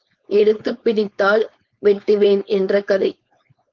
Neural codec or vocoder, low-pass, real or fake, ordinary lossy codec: codec, 16 kHz, 4.8 kbps, FACodec; 7.2 kHz; fake; Opus, 32 kbps